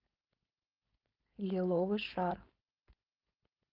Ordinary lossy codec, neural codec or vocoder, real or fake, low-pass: Opus, 24 kbps; codec, 16 kHz, 4.8 kbps, FACodec; fake; 5.4 kHz